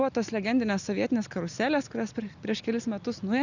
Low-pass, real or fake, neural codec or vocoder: 7.2 kHz; real; none